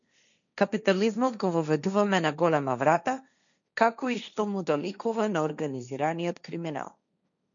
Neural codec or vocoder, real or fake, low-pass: codec, 16 kHz, 1.1 kbps, Voila-Tokenizer; fake; 7.2 kHz